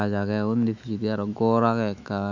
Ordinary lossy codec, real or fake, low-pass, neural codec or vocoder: none; fake; 7.2 kHz; autoencoder, 48 kHz, 128 numbers a frame, DAC-VAE, trained on Japanese speech